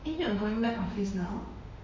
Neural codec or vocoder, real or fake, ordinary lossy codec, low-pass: autoencoder, 48 kHz, 32 numbers a frame, DAC-VAE, trained on Japanese speech; fake; MP3, 48 kbps; 7.2 kHz